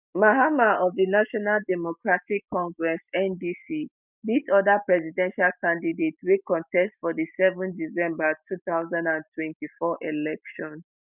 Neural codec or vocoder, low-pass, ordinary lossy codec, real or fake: none; 3.6 kHz; none; real